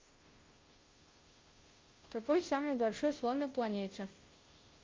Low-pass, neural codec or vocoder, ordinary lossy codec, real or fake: 7.2 kHz; codec, 16 kHz, 0.5 kbps, FunCodec, trained on Chinese and English, 25 frames a second; Opus, 24 kbps; fake